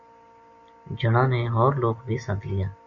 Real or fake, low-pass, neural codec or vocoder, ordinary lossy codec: real; 7.2 kHz; none; AAC, 64 kbps